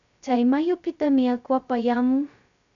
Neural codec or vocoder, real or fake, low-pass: codec, 16 kHz, 0.2 kbps, FocalCodec; fake; 7.2 kHz